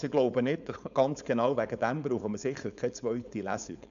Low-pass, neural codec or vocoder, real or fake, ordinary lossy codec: 7.2 kHz; codec, 16 kHz, 4.8 kbps, FACodec; fake; none